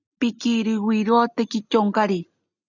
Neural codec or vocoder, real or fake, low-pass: none; real; 7.2 kHz